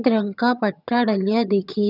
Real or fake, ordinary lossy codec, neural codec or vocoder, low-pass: fake; none; vocoder, 22.05 kHz, 80 mel bands, HiFi-GAN; 5.4 kHz